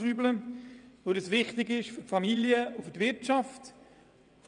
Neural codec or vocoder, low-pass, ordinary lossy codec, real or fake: vocoder, 22.05 kHz, 80 mel bands, WaveNeXt; 9.9 kHz; none; fake